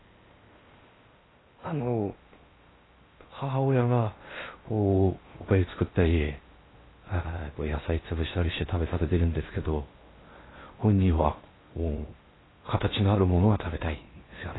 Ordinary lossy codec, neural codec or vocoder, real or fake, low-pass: AAC, 16 kbps; codec, 16 kHz in and 24 kHz out, 0.6 kbps, FocalCodec, streaming, 4096 codes; fake; 7.2 kHz